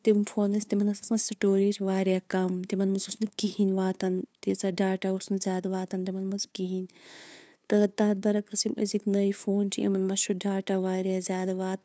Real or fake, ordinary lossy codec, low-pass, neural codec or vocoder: fake; none; none; codec, 16 kHz, 2 kbps, FunCodec, trained on LibriTTS, 25 frames a second